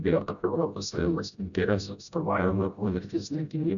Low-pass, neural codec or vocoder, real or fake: 7.2 kHz; codec, 16 kHz, 0.5 kbps, FreqCodec, smaller model; fake